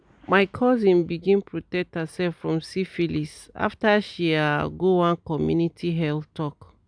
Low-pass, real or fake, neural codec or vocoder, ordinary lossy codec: 9.9 kHz; real; none; none